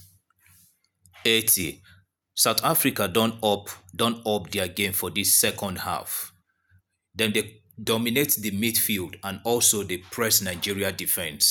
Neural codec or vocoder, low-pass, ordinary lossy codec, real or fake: none; none; none; real